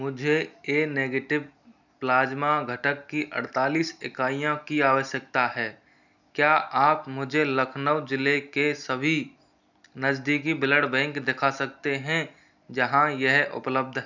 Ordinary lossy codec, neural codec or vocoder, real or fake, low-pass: none; none; real; 7.2 kHz